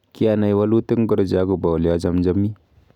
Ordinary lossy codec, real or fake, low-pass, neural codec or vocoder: none; real; 19.8 kHz; none